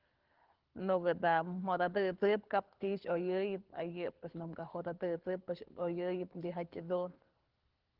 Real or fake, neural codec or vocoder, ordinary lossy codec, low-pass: fake; codec, 24 kHz, 6 kbps, HILCodec; Opus, 16 kbps; 5.4 kHz